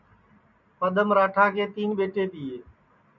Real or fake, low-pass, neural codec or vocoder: real; 7.2 kHz; none